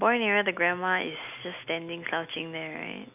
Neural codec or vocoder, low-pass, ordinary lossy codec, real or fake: none; 3.6 kHz; none; real